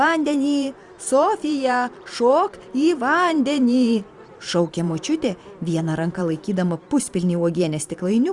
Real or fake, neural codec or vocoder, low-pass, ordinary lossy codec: fake; vocoder, 44.1 kHz, 128 mel bands every 512 samples, BigVGAN v2; 10.8 kHz; Opus, 64 kbps